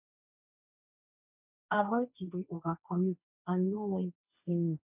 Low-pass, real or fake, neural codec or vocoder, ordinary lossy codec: 3.6 kHz; fake; codec, 16 kHz, 1.1 kbps, Voila-Tokenizer; none